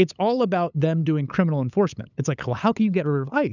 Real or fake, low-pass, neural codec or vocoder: fake; 7.2 kHz; codec, 16 kHz, 8 kbps, FunCodec, trained on LibriTTS, 25 frames a second